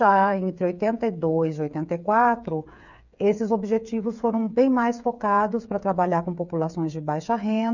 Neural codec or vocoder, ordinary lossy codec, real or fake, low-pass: codec, 16 kHz, 8 kbps, FreqCodec, smaller model; none; fake; 7.2 kHz